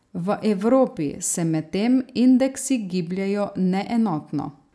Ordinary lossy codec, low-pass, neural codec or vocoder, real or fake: none; none; none; real